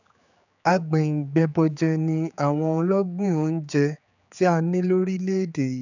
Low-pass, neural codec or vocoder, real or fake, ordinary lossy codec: 7.2 kHz; codec, 16 kHz, 4 kbps, X-Codec, HuBERT features, trained on general audio; fake; none